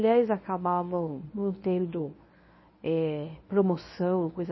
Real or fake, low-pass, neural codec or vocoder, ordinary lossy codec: fake; 7.2 kHz; codec, 24 kHz, 0.9 kbps, WavTokenizer, medium speech release version 1; MP3, 24 kbps